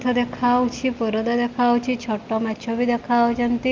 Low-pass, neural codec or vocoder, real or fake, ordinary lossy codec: 7.2 kHz; none; real; Opus, 24 kbps